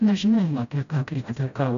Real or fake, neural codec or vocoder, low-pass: fake; codec, 16 kHz, 0.5 kbps, FreqCodec, smaller model; 7.2 kHz